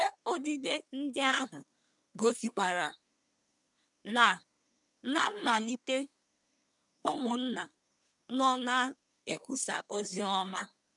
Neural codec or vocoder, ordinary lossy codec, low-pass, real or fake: codec, 24 kHz, 1 kbps, SNAC; none; 10.8 kHz; fake